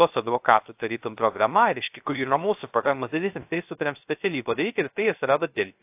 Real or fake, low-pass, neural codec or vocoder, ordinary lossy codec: fake; 3.6 kHz; codec, 16 kHz, 0.3 kbps, FocalCodec; AAC, 32 kbps